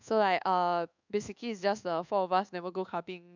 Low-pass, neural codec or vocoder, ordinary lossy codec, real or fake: 7.2 kHz; codec, 24 kHz, 1.2 kbps, DualCodec; none; fake